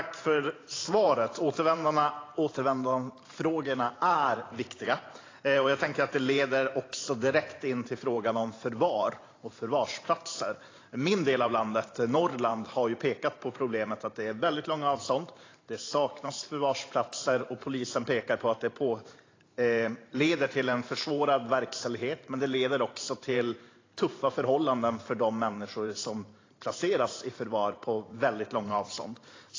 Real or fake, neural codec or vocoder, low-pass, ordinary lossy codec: fake; vocoder, 44.1 kHz, 128 mel bands every 512 samples, BigVGAN v2; 7.2 kHz; AAC, 32 kbps